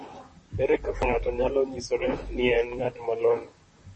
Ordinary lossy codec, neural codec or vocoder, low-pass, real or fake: MP3, 32 kbps; vocoder, 44.1 kHz, 128 mel bands, Pupu-Vocoder; 10.8 kHz; fake